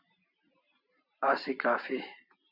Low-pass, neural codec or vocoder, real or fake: 5.4 kHz; vocoder, 44.1 kHz, 128 mel bands every 512 samples, BigVGAN v2; fake